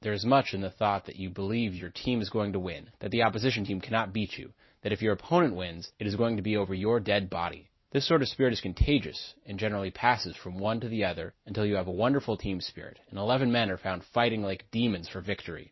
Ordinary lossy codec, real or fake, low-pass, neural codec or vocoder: MP3, 24 kbps; real; 7.2 kHz; none